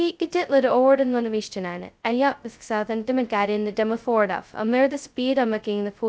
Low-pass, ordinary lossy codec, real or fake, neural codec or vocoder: none; none; fake; codec, 16 kHz, 0.2 kbps, FocalCodec